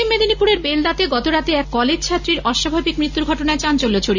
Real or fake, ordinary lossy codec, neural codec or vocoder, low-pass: real; none; none; 7.2 kHz